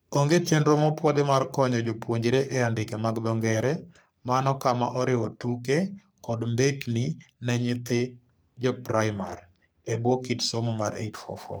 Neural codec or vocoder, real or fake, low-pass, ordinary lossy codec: codec, 44.1 kHz, 3.4 kbps, Pupu-Codec; fake; none; none